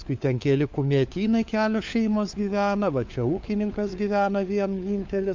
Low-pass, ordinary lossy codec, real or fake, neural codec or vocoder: 7.2 kHz; MP3, 64 kbps; fake; codec, 16 kHz, 4 kbps, FunCodec, trained on LibriTTS, 50 frames a second